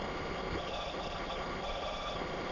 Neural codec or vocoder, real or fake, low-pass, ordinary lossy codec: autoencoder, 22.05 kHz, a latent of 192 numbers a frame, VITS, trained on many speakers; fake; 7.2 kHz; none